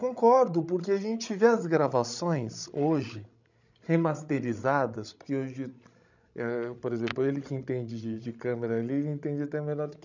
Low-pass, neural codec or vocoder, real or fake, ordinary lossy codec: 7.2 kHz; codec, 16 kHz, 16 kbps, FreqCodec, larger model; fake; none